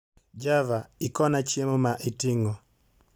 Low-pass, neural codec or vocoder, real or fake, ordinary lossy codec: none; none; real; none